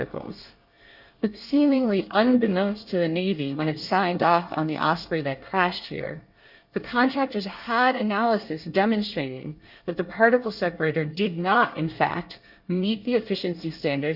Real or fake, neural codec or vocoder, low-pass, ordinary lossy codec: fake; codec, 24 kHz, 1 kbps, SNAC; 5.4 kHz; Opus, 64 kbps